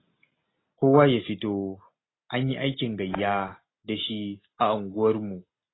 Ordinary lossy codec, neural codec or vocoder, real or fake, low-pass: AAC, 16 kbps; none; real; 7.2 kHz